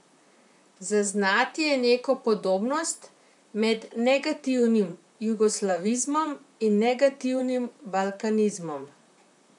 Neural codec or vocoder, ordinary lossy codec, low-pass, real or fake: vocoder, 44.1 kHz, 128 mel bands, Pupu-Vocoder; none; 10.8 kHz; fake